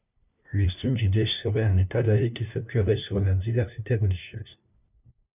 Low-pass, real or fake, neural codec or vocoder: 3.6 kHz; fake; codec, 16 kHz, 1 kbps, FunCodec, trained on LibriTTS, 50 frames a second